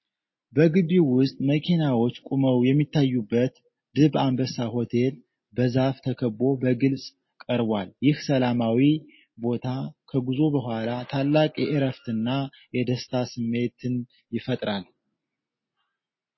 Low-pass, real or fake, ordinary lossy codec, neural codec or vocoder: 7.2 kHz; real; MP3, 24 kbps; none